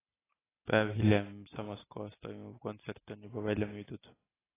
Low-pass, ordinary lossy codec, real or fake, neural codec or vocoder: 3.6 kHz; AAC, 16 kbps; real; none